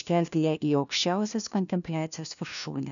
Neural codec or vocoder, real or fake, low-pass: codec, 16 kHz, 1 kbps, FunCodec, trained on LibriTTS, 50 frames a second; fake; 7.2 kHz